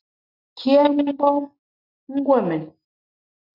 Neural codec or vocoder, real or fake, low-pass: none; real; 5.4 kHz